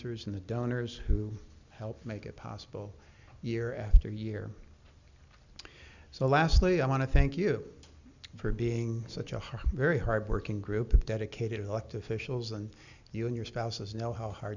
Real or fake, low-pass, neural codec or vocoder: real; 7.2 kHz; none